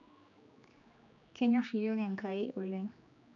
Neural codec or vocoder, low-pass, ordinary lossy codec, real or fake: codec, 16 kHz, 2 kbps, X-Codec, HuBERT features, trained on general audio; 7.2 kHz; MP3, 96 kbps; fake